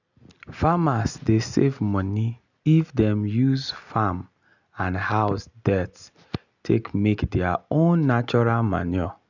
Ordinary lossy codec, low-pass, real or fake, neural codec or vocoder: none; 7.2 kHz; real; none